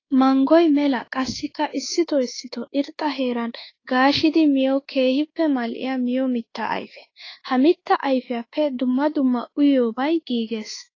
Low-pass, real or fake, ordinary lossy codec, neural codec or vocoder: 7.2 kHz; fake; AAC, 32 kbps; codec, 24 kHz, 1.2 kbps, DualCodec